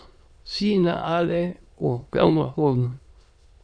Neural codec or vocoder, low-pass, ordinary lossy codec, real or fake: autoencoder, 22.05 kHz, a latent of 192 numbers a frame, VITS, trained on many speakers; 9.9 kHz; AAC, 64 kbps; fake